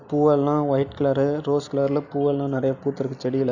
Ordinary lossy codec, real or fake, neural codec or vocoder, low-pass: none; real; none; 7.2 kHz